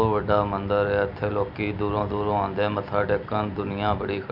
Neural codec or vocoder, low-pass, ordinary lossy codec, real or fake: none; 5.4 kHz; none; real